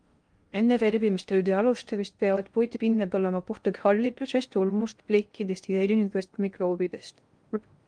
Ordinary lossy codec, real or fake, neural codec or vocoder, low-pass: Opus, 32 kbps; fake; codec, 16 kHz in and 24 kHz out, 0.6 kbps, FocalCodec, streaming, 2048 codes; 9.9 kHz